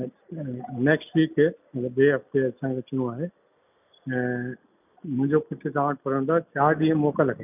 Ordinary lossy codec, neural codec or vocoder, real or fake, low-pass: none; none; real; 3.6 kHz